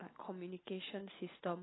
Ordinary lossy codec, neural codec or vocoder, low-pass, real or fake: AAC, 16 kbps; none; 7.2 kHz; real